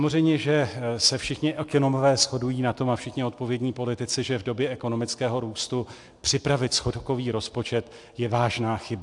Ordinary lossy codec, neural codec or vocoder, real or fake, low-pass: AAC, 64 kbps; none; real; 10.8 kHz